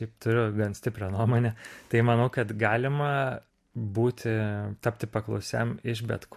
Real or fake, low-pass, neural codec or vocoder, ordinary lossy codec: real; 14.4 kHz; none; MP3, 64 kbps